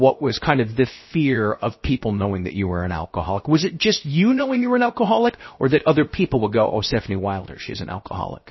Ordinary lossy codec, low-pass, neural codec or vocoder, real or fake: MP3, 24 kbps; 7.2 kHz; codec, 16 kHz, 0.7 kbps, FocalCodec; fake